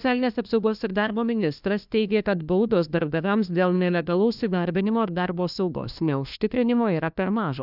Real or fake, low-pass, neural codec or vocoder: fake; 5.4 kHz; codec, 16 kHz, 1 kbps, FunCodec, trained on LibriTTS, 50 frames a second